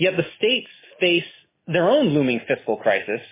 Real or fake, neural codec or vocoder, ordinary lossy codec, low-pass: real; none; MP3, 16 kbps; 3.6 kHz